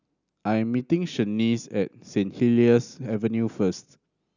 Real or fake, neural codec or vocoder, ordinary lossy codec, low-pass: real; none; none; 7.2 kHz